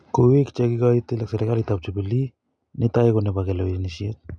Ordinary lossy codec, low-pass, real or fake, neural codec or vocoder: none; none; real; none